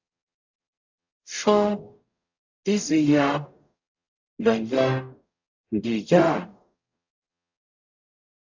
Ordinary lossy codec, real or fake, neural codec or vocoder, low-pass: AAC, 48 kbps; fake; codec, 44.1 kHz, 0.9 kbps, DAC; 7.2 kHz